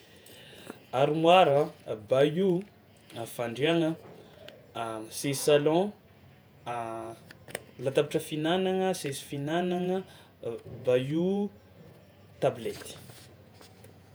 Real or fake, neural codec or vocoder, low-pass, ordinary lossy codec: fake; vocoder, 48 kHz, 128 mel bands, Vocos; none; none